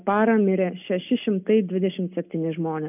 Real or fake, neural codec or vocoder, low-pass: real; none; 3.6 kHz